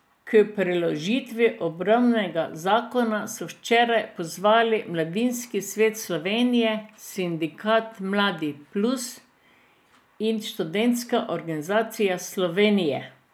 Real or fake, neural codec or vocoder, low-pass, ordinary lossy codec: real; none; none; none